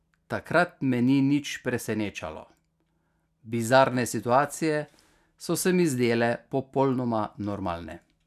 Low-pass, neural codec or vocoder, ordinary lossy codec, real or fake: 14.4 kHz; none; none; real